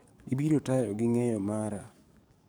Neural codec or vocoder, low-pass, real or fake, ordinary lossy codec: codec, 44.1 kHz, 7.8 kbps, DAC; none; fake; none